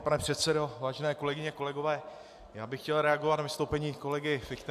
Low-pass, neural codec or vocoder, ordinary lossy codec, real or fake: 14.4 kHz; none; AAC, 96 kbps; real